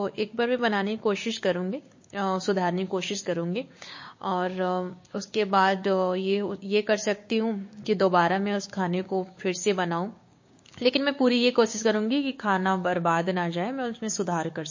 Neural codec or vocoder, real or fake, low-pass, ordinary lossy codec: codec, 16 kHz, 6 kbps, DAC; fake; 7.2 kHz; MP3, 32 kbps